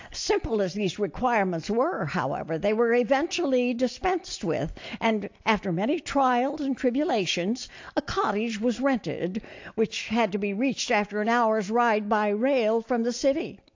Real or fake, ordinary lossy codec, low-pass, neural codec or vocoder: real; AAC, 48 kbps; 7.2 kHz; none